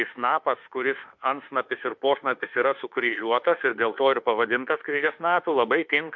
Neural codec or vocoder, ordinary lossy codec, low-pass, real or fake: autoencoder, 48 kHz, 32 numbers a frame, DAC-VAE, trained on Japanese speech; MP3, 48 kbps; 7.2 kHz; fake